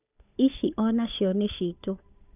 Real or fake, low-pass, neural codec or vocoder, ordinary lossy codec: fake; 3.6 kHz; codec, 16 kHz, 8 kbps, FunCodec, trained on Chinese and English, 25 frames a second; none